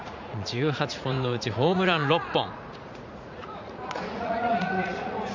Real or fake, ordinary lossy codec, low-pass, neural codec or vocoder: fake; MP3, 64 kbps; 7.2 kHz; vocoder, 44.1 kHz, 80 mel bands, Vocos